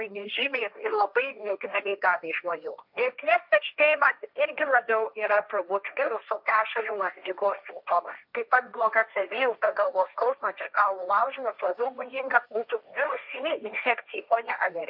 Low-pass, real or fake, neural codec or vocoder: 5.4 kHz; fake; codec, 16 kHz, 1.1 kbps, Voila-Tokenizer